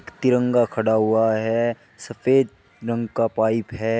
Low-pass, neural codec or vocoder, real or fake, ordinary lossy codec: none; none; real; none